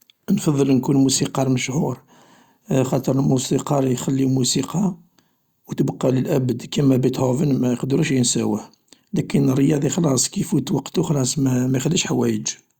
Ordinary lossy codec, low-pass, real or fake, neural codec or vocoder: Opus, 64 kbps; 19.8 kHz; real; none